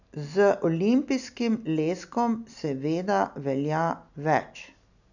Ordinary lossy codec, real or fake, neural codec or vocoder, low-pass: none; real; none; 7.2 kHz